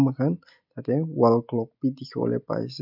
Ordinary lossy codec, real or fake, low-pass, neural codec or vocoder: none; real; 5.4 kHz; none